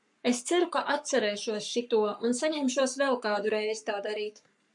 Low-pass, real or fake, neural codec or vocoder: 10.8 kHz; fake; codec, 44.1 kHz, 7.8 kbps, Pupu-Codec